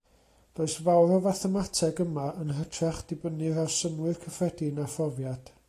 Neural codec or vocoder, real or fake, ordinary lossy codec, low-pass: none; real; AAC, 48 kbps; 14.4 kHz